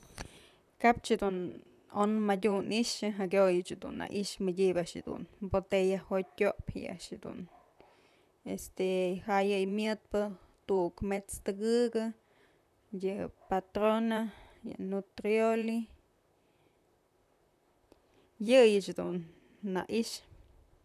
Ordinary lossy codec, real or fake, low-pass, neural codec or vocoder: none; fake; 14.4 kHz; vocoder, 44.1 kHz, 128 mel bands, Pupu-Vocoder